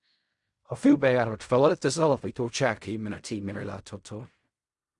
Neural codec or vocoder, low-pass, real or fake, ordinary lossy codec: codec, 16 kHz in and 24 kHz out, 0.4 kbps, LongCat-Audio-Codec, fine tuned four codebook decoder; 10.8 kHz; fake; Opus, 64 kbps